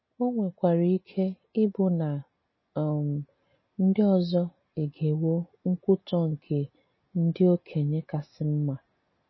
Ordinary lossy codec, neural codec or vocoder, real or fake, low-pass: MP3, 24 kbps; none; real; 7.2 kHz